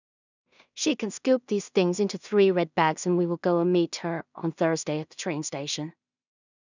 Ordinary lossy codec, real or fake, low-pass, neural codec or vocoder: none; fake; 7.2 kHz; codec, 16 kHz in and 24 kHz out, 0.4 kbps, LongCat-Audio-Codec, two codebook decoder